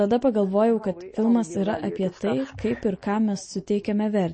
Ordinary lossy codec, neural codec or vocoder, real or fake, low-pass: MP3, 32 kbps; none; real; 9.9 kHz